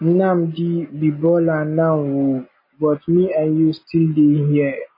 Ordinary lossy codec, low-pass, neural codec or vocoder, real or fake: MP3, 24 kbps; 5.4 kHz; none; real